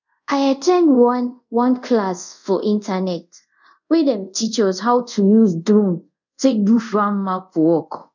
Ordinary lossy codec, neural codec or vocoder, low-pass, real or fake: none; codec, 24 kHz, 0.5 kbps, DualCodec; 7.2 kHz; fake